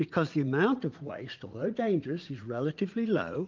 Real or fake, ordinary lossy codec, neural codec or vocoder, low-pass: fake; Opus, 32 kbps; vocoder, 44.1 kHz, 80 mel bands, Vocos; 7.2 kHz